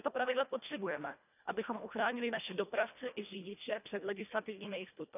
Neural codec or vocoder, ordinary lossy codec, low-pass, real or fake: codec, 24 kHz, 1.5 kbps, HILCodec; none; 3.6 kHz; fake